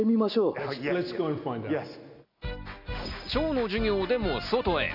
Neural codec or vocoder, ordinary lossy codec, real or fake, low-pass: none; none; real; 5.4 kHz